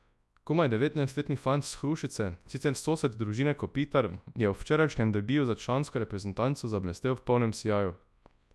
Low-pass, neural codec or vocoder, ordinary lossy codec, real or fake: none; codec, 24 kHz, 0.9 kbps, WavTokenizer, large speech release; none; fake